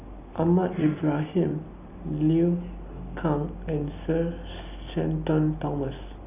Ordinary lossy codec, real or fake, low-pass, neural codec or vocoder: AAC, 32 kbps; real; 3.6 kHz; none